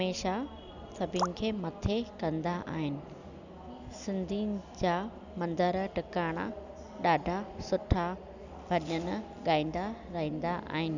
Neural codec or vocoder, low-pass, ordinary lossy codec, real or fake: none; 7.2 kHz; none; real